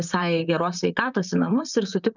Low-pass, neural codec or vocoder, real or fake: 7.2 kHz; vocoder, 44.1 kHz, 128 mel bands, Pupu-Vocoder; fake